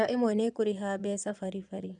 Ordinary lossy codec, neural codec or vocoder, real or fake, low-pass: none; vocoder, 22.05 kHz, 80 mel bands, Vocos; fake; 9.9 kHz